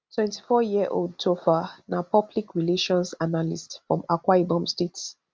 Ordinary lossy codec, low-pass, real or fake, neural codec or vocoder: none; none; real; none